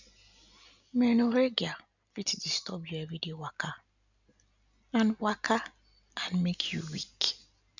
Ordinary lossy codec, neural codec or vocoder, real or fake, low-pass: none; none; real; 7.2 kHz